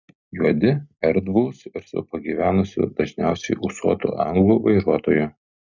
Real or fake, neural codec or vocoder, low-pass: real; none; 7.2 kHz